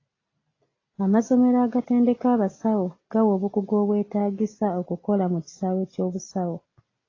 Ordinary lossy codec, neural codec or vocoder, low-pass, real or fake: AAC, 32 kbps; none; 7.2 kHz; real